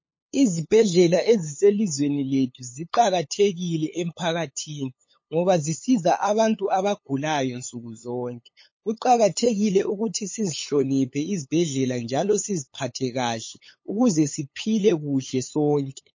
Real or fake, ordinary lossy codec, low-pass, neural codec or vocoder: fake; MP3, 32 kbps; 7.2 kHz; codec, 16 kHz, 8 kbps, FunCodec, trained on LibriTTS, 25 frames a second